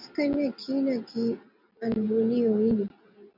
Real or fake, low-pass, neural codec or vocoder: real; 5.4 kHz; none